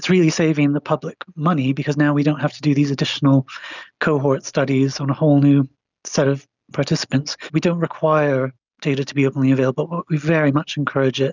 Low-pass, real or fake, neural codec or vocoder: 7.2 kHz; real; none